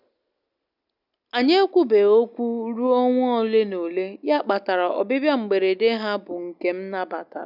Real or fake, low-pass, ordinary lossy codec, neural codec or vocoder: real; 5.4 kHz; none; none